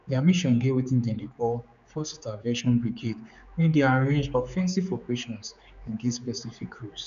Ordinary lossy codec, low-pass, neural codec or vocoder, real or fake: none; 7.2 kHz; codec, 16 kHz, 4 kbps, X-Codec, HuBERT features, trained on general audio; fake